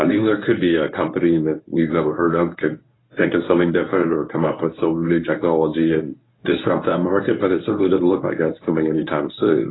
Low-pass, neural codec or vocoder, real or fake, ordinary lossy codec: 7.2 kHz; codec, 24 kHz, 0.9 kbps, WavTokenizer, medium speech release version 1; fake; AAC, 16 kbps